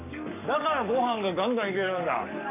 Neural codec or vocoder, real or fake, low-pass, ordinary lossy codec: codec, 44.1 kHz, 7.8 kbps, Pupu-Codec; fake; 3.6 kHz; none